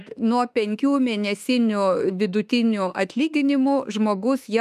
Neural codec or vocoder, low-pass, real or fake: autoencoder, 48 kHz, 32 numbers a frame, DAC-VAE, trained on Japanese speech; 14.4 kHz; fake